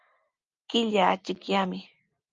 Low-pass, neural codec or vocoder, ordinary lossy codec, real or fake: 7.2 kHz; none; Opus, 32 kbps; real